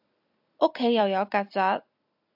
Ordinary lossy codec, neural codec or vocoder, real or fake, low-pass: AAC, 48 kbps; vocoder, 44.1 kHz, 128 mel bands every 256 samples, BigVGAN v2; fake; 5.4 kHz